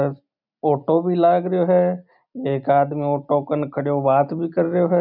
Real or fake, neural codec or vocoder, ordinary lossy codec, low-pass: real; none; none; 5.4 kHz